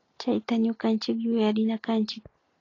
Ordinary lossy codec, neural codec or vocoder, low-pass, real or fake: AAC, 32 kbps; none; 7.2 kHz; real